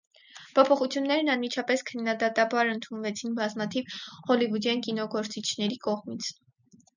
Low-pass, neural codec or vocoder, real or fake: 7.2 kHz; none; real